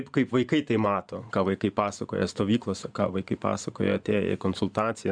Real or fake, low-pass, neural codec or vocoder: fake; 9.9 kHz; vocoder, 24 kHz, 100 mel bands, Vocos